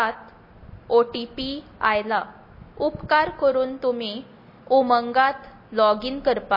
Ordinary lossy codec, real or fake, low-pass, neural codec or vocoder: MP3, 24 kbps; real; 5.4 kHz; none